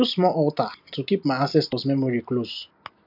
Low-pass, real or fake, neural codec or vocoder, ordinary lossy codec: 5.4 kHz; real; none; none